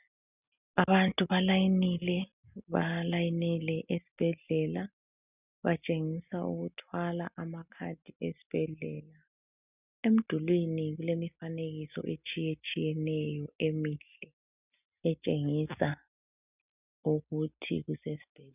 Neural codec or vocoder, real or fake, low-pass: none; real; 3.6 kHz